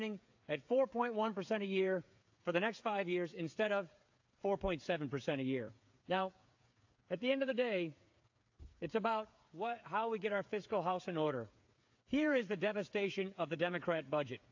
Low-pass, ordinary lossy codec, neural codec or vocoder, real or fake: 7.2 kHz; AAC, 48 kbps; codec, 16 kHz, 8 kbps, FreqCodec, smaller model; fake